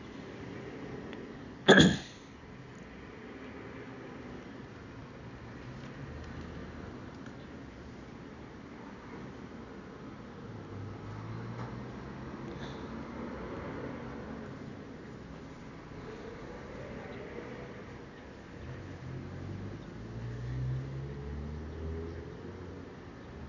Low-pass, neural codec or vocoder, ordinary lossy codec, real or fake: 7.2 kHz; none; none; real